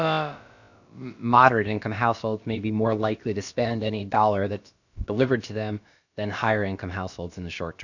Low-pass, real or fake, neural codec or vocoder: 7.2 kHz; fake; codec, 16 kHz, about 1 kbps, DyCAST, with the encoder's durations